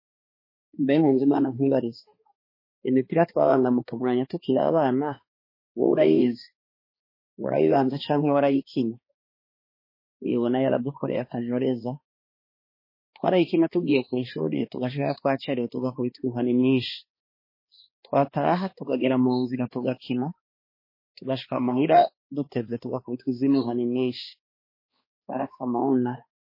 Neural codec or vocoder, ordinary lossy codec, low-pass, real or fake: codec, 16 kHz, 2 kbps, X-Codec, HuBERT features, trained on balanced general audio; MP3, 24 kbps; 5.4 kHz; fake